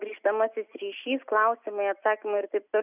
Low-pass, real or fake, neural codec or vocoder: 3.6 kHz; real; none